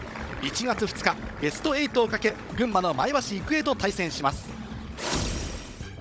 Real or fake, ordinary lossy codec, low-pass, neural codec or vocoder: fake; none; none; codec, 16 kHz, 16 kbps, FunCodec, trained on Chinese and English, 50 frames a second